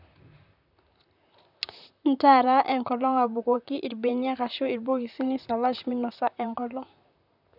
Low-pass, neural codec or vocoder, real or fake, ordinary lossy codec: 5.4 kHz; vocoder, 44.1 kHz, 128 mel bands, Pupu-Vocoder; fake; none